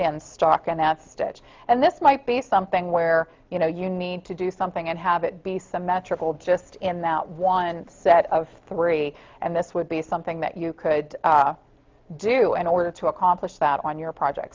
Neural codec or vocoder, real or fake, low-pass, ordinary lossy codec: none; real; 7.2 kHz; Opus, 32 kbps